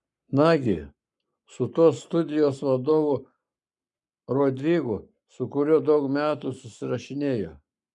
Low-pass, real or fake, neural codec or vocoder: 10.8 kHz; fake; codec, 44.1 kHz, 7.8 kbps, Pupu-Codec